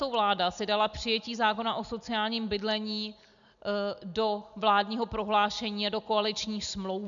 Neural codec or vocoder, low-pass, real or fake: none; 7.2 kHz; real